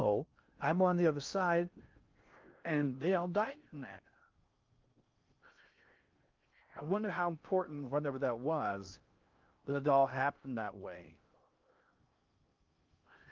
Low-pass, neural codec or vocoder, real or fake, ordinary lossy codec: 7.2 kHz; codec, 16 kHz in and 24 kHz out, 0.6 kbps, FocalCodec, streaming, 2048 codes; fake; Opus, 24 kbps